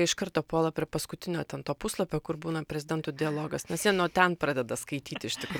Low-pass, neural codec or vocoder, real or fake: 19.8 kHz; none; real